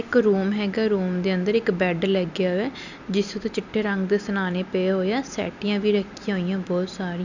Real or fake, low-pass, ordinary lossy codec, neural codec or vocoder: real; 7.2 kHz; none; none